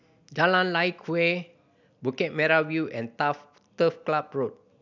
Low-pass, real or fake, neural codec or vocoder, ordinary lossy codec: 7.2 kHz; real; none; none